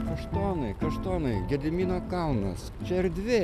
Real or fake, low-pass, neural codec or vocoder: real; 14.4 kHz; none